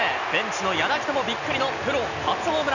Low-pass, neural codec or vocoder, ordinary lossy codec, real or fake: 7.2 kHz; none; none; real